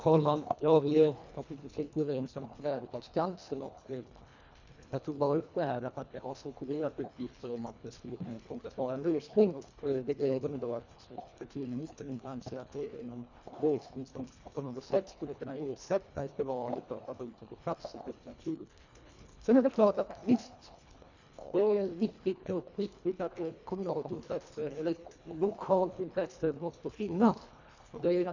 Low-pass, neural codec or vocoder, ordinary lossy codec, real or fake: 7.2 kHz; codec, 24 kHz, 1.5 kbps, HILCodec; none; fake